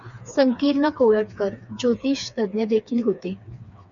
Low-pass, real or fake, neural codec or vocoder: 7.2 kHz; fake; codec, 16 kHz, 4 kbps, FreqCodec, smaller model